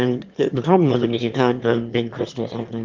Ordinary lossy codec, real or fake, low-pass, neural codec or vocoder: Opus, 32 kbps; fake; 7.2 kHz; autoencoder, 22.05 kHz, a latent of 192 numbers a frame, VITS, trained on one speaker